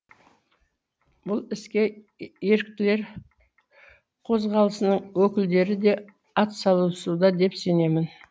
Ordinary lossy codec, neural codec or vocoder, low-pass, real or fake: none; none; none; real